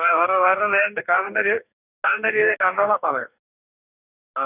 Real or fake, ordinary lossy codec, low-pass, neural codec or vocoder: fake; none; 3.6 kHz; codec, 44.1 kHz, 2.6 kbps, DAC